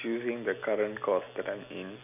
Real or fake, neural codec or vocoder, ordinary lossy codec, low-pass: real; none; none; 3.6 kHz